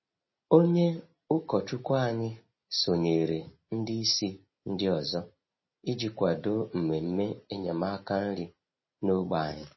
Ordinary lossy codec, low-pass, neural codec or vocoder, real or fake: MP3, 24 kbps; 7.2 kHz; none; real